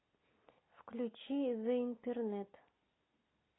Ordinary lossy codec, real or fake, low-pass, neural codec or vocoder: AAC, 16 kbps; real; 7.2 kHz; none